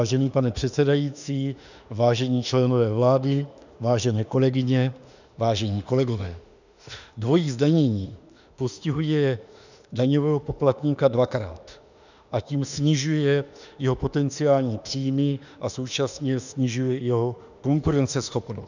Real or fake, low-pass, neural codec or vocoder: fake; 7.2 kHz; autoencoder, 48 kHz, 32 numbers a frame, DAC-VAE, trained on Japanese speech